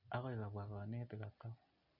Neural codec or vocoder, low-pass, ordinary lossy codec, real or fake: none; 5.4 kHz; none; real